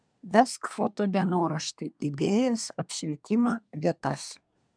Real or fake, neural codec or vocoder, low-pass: fake; codec, 24 kHz, 1 kbps, SNAC; 9.9 kHz